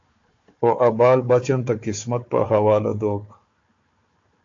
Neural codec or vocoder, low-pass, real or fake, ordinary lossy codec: codec, 16 kHz, 4 kbps, FunCodec, trained on Chinese and English, 50 frames a second; 7.2 kHz; fake; AAC, 48 kbps